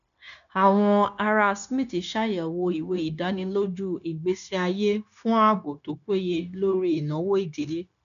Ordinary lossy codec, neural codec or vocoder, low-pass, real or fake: none; codec, 16 kHz, 0.9 kbps, LongCat-Audio-Codec; 7.2 kHz; fake